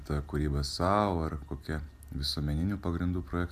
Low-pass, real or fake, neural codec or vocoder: 14.4 kHz; real; none